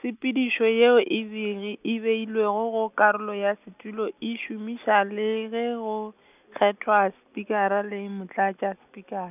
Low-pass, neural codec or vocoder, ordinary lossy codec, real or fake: 3.6 kHz; none; none; real